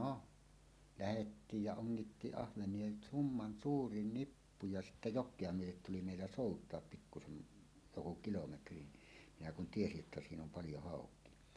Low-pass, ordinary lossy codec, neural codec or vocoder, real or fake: none; none; none; real